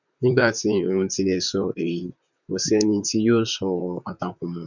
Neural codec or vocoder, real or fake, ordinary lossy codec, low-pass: vocoder, 44.1 kHz, 128 mel bands, Pupu-Vocoder; fake; none; 7.2 kHz